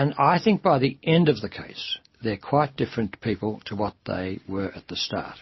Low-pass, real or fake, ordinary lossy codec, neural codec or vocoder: 7.2 kHz; real; MP3, 24 kbps; none